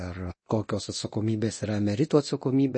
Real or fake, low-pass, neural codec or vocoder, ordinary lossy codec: fake; 9.9 kHz; codec, 24 kHz, 0.9 kbps, DualCodec; MP3, 32 kbps